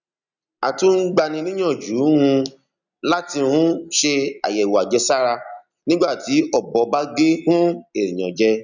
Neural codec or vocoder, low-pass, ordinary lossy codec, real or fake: none; 7.2 kHz; none; real